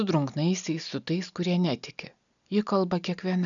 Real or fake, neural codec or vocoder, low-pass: real; none; 7.2 kHz